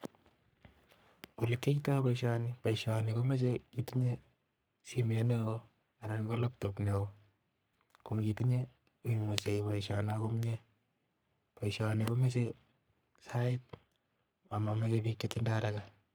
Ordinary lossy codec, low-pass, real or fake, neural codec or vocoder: none; none; fake; codec, 44.1 kHz, 3.4 kbps, Pupu-Codec